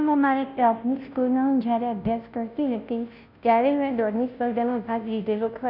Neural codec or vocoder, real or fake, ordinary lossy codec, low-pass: codec, 16 kHz, 0.5 kbps, FunCodec, trained on Chinese and English, 25 frames a second; fake; none; 5.4 kHz